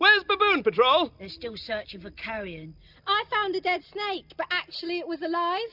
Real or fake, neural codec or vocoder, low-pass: real; none; 5.4 kHz